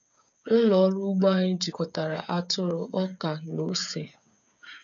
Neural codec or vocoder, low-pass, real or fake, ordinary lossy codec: codec, 16 kHz, 8 kbps, FreqCodec, smaller model; 7.2 kHz; fake; none